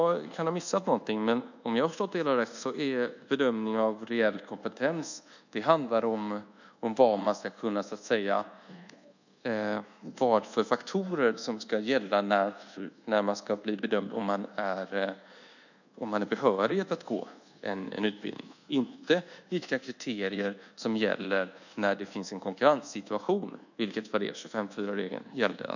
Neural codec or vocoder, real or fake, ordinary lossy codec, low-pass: codec, 24 kHz, 1.2 kbps, DualCodec; fake; none; 7.2 kHz